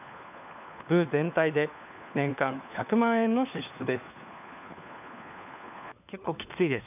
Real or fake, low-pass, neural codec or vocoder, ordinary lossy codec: fake; 3.6 kHz; codec, 16 kHz, 4 kbps, FunCodec, trained on LibriTTS, 50 frames a second; none